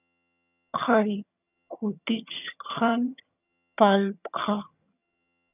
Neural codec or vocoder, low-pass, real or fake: vocoder, 22.05 kHz, 80 mel bands, HiFi-GAN; 3.6 kHz; fake